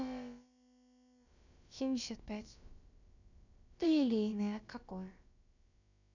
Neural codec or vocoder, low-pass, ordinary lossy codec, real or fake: codec, 16 kHz, about 1 kbps, DyCAST, with the encoder's durations; 7.2 kHz; none; fake